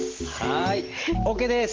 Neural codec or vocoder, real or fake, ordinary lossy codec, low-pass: none; real; Opus, 16 kbps; 7.2 kHz